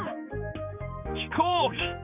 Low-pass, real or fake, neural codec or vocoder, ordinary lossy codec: 3.6 kHz; fake; codec, 16 kHz, 4 kbps, X-Codec, HuBERT features, trained on general audio; none